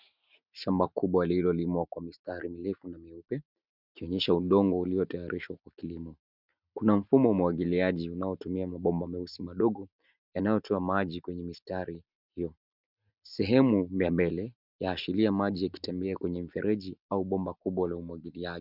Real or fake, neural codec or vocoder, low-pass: real; none; 5.4 kHz